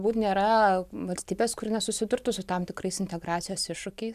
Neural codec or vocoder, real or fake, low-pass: none; real; 14.4 kHz